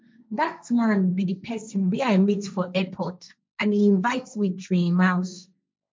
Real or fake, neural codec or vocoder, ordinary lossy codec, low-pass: fake; codec, 16 kHz, 1.1 kbps, Voila-Tokenizer; none; none